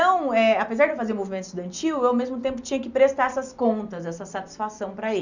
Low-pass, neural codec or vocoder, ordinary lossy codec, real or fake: 7.2 kHz; none; none; real